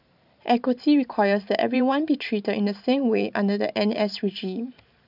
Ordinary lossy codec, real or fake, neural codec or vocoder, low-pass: none; fake; vocoder, 44.1 kHz, 128 mel bands every 512 samples, BigVGAN v2; 5.4 kHz